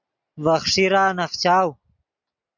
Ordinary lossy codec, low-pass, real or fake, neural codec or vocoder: MP3, 64 kbps; 7.2 kHz; real; none